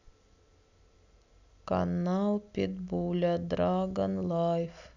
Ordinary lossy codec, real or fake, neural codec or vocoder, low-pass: none; real; none; 7.2 kHz